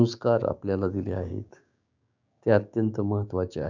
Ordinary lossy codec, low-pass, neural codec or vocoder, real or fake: none; 7.2 kHz; codec, 16 kHz, 6 kbps, DAC; fake